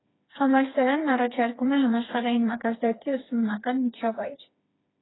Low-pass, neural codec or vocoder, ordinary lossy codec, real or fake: 7.2 kHz; codec, 16 kHz, 2 kbps, FreqCodec, smaller model; AAC, 16 kbps; fake